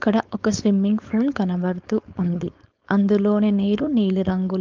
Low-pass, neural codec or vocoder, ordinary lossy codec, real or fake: 7.2 kHz; codec, 16 kHz, 4.8 kbps, FACodec; Opus, 32 kbps; fake